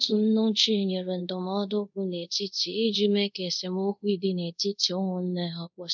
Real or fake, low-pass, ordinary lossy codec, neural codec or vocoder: fake; 7.2 kHz; none; codec, 24 kHz, 0.5 kbps, DualCodec